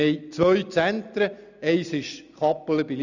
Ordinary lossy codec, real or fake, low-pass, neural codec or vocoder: none; real; 7.2 kHz; none